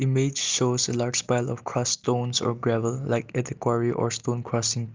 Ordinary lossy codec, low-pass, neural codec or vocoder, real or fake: Opus, 24 kbps; 7.2 kHz; none; real